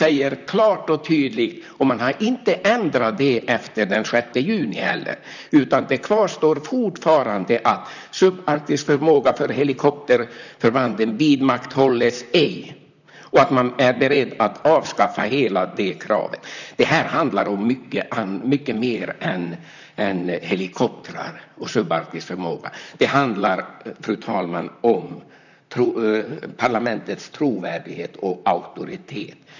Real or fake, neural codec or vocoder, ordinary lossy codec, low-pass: fake; vocoder, 44.1 kHz, 128 mel bands, Pupu-Vocoder; none; 7.2 kHz